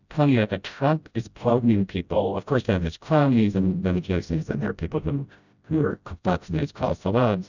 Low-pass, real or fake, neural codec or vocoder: 7.2 kHz; fake; codec, 16 kHz, 0.5 kbps, FreqCodec, smaller model